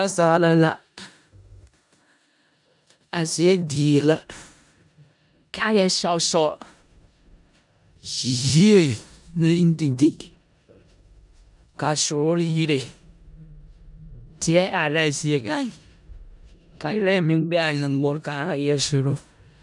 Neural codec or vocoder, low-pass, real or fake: codec, 16 kHz in and 24 kHz out, 0.4 kbps, LongCat-Audio-Codec, four codebook decoder; 10.8 kHz; fake